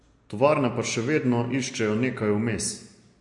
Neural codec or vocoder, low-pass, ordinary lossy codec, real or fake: none; 10.8 kHz; MP3, 48 kbps; real